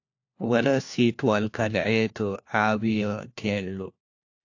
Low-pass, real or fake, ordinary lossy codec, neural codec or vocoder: 7.2 kHz; fake; AAC, 48 kbps; codec, 16 kHz, 1 kbps, FunCodec, trained on LibriTTS, 50 frames a second